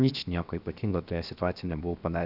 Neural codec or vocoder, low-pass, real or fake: codec, 16 kHz, 0.7 kbps, FocalCodec; 5.4 kHz; fake